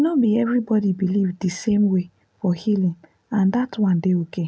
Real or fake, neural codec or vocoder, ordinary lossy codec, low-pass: real; none; none; none